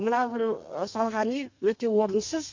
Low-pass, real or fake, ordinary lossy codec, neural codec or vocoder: 7.2 kHz; fake; MP3, 48 kbps; codec, 32 kHz, 1.9 kbps, SNAC